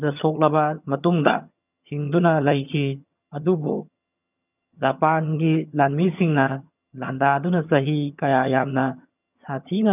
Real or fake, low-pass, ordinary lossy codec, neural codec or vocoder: fake; 3.6 kHz; none; vocoder, 22.05 kHz, 80 mel bands, HiFi-GAN